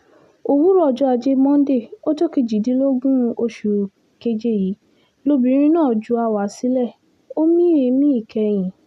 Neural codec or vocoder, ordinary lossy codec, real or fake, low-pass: none; none; real; 14.4 kHz